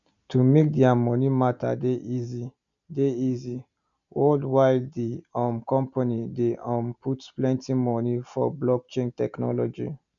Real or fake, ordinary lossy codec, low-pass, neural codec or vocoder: real; none; 7.2 kHz; none